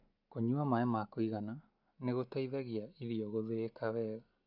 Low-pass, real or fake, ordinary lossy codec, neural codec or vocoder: 5.4 kHz; real; none; none